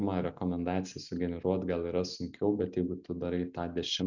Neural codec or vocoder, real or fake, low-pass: none; real; 7.2 kHz